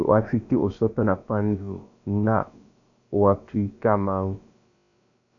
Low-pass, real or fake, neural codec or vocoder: 7.2 kHz; fake; codec, 16 kHz, about 1 kbps, DyCAST, with the encoder's durations